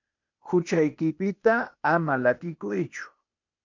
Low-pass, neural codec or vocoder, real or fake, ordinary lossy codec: 7.2 kHz; codec, 16 kHz, 0.8 kbps, ZipCodec; fake; MP3, 64 kbps